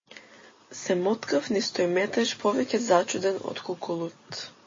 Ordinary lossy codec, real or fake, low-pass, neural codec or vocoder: MP3, 32 kbps; real; 7.2 kHz; none